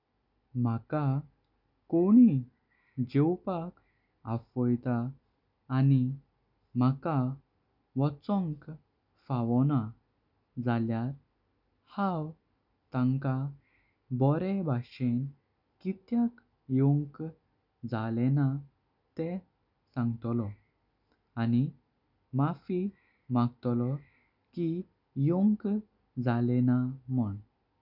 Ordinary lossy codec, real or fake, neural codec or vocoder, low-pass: none; real; none; 5.4 kHz